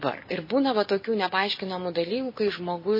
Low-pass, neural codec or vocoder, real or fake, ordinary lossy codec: 5.4 kHz; none; real; MP3, 24 kbps